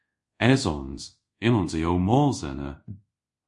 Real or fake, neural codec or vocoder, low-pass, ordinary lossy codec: fake; codec, 24 kHz, 0.5 kbps, DualCodec; 10.8 kHz; MP3, 48 kbps